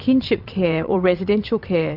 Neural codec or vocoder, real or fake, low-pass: vocoder, 22.05 kHz, 80 mel bands, WaveNeXt; fake; 5.4 kHz